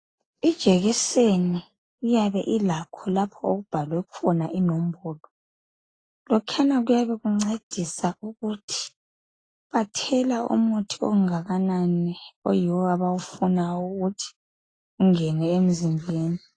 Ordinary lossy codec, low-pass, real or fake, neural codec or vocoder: AAC, 32 kbps; 9.9 kHz; real; none